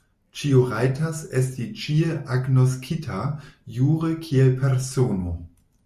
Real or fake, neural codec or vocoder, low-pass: real; none; 14.4 kHz